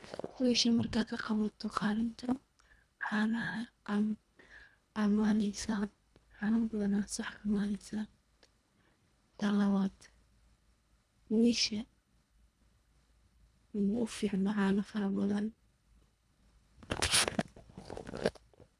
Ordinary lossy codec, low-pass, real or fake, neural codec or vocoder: none; none; fake; codec, 24 kHz, 1.5 kbps, HILCodec